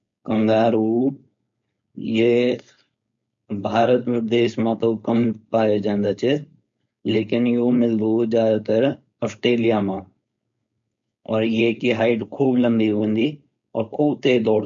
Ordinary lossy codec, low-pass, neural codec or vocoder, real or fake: MP3, 48 kbps; 7.2 kHz; codec, 16 kHz, 4.8 kbps, FACodec; fake